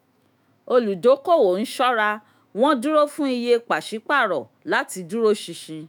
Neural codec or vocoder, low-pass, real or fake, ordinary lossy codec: autoencoder, 48 kHz, 128 numbers a frame, DAC-VAE, trained on Japanese speech; none; fake; none